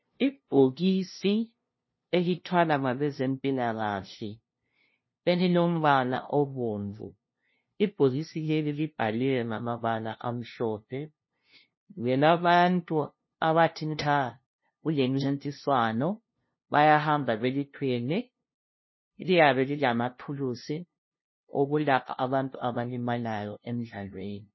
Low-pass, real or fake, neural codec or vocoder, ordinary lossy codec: 7.2 kHz; fake; codec, 16 kHz, 0.5 kbps, FunCodec, trained on LibriTTS, 25 frames a second; MP3, 24 kbps